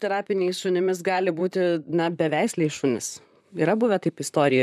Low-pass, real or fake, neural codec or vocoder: 14.4 kHz; fake; vocoder, 44.1 kHz, 128 mel bands, Pupu-Vocoder